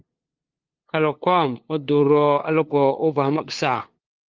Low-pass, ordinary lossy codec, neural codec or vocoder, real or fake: 7.2 kHz; Opus, 32 kbps; codec, 16 kHz, 2 kbps, FunCodec, trained on LibriTTS, 25 frames a second; fake